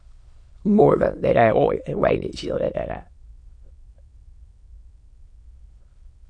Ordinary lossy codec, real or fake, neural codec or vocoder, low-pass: MP3, 48 kbps; fake; autoencoder, 22.05 kHz, a latent of 192 numbers a frame, VITS, trained on many speakers; 9.9 kHz